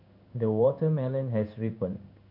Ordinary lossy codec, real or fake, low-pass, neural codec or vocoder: none; fake; 5.4 kHz; codec, 16 kHz in and 24 kHz out, 1 kbps, XY-Tokenizer